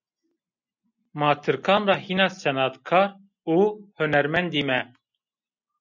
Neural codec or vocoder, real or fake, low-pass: none; real; 7.2 kHz